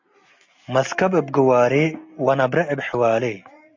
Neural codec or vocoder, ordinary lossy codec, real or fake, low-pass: none; AAC, 48 kbps; real; 7.2 kHz